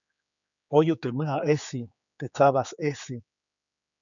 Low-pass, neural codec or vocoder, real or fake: 7.2 kHz; codec, 16 kHz, 4 kbps, X-Codec, HuBERT features, trained on general audio; fake